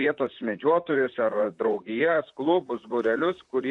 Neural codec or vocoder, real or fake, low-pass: vocoder, 44.1 kHz, 128 mel bands, Pupu-Vocoder; fake; 10.8 kHz